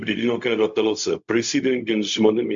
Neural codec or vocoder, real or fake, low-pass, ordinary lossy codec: codec, 16 kHz, 0.4 kbps, LongCat-Audio-Codec; fake; 7.2 kHz; MP3, 64 kbps